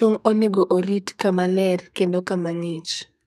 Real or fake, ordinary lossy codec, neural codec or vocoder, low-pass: fake; none; codec, 32 kHz, 1.9 kbps, SNAC; 14.4 kHz